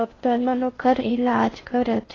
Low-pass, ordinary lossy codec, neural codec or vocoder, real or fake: 7.2 kHz; AAC, 32 kbps; codec, 16 kHz in and 24 kHz out, 0.6 kbps, FocalCodec, streaming, 4096 codes; fake